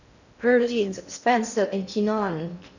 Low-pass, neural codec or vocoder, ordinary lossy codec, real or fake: 7.2 kHz; codec, 16 kHz in and 24 kHz out, 0.6 kbps, FocalCodec, streaming, 2048 codes; none; fake